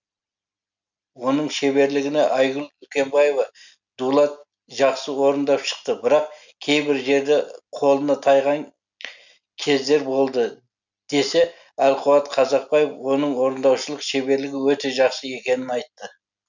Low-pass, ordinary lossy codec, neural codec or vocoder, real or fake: 7.2 kHz; none; none; real